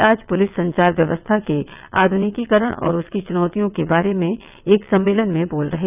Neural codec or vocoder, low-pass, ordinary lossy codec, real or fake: vocoder, 22.05 kHz, 80 mel bands, WaveNeXt; 3.6 kHz; none; fake